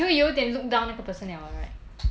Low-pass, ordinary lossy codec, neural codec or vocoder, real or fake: none; none; none; real